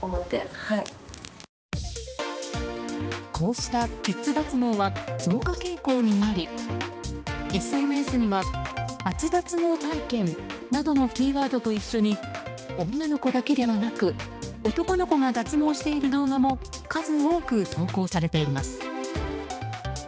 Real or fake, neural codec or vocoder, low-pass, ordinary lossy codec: fake; codec, 16 kHz, 2 kbps, X-Codec, HuBERT features, trained on balanced general audio; none; none